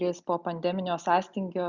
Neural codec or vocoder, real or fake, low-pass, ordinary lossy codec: none; real; 7.2 kHz; Opus, 64 kbps